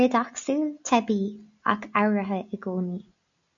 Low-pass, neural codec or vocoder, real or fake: 7.2 kHz; none; real